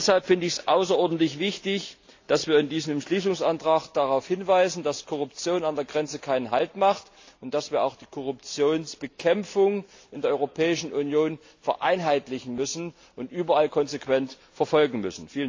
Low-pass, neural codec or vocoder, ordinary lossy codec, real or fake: 7.2 kHz; none; AAC, 48 kbps; real